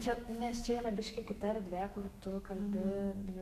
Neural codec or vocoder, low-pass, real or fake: codec, 32 kHz, 1.9 kbps, SNAC; 14.4 kHz; fake